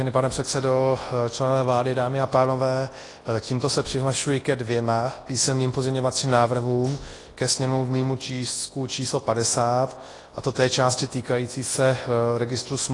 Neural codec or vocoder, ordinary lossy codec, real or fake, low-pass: codec, 24 kHz, 0.9 kbps, WavTokenizer, large speech release; AAC, 32 kbps; fake; 10.8 kHz